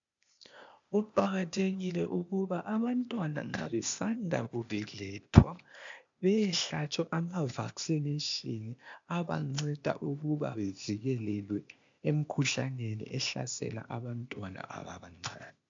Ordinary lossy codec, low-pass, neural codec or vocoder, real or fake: MP3, 64 kbps; 7.2 kHz; codec, 16 kHz, 0.8 kbps, ZipCodec; fake